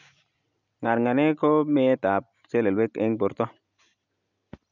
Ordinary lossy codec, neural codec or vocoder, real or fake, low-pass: none; none; real; 7.2 kHz